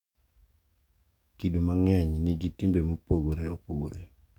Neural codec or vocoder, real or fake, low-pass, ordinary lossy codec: codec, 44.1 kHz, 7.8 kbps, DAC; fake; 19.8 kHz; none